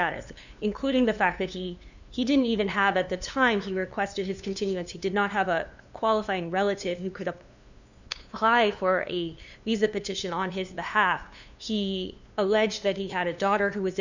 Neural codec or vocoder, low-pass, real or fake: codec, 16 kHz, 2 kbps, FunCodec, trained on LibriTTS, 25 frames a second; 7.2 kHz; fake